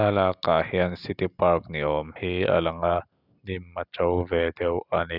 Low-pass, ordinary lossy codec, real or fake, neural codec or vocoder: 5.4 kHz; none; real; none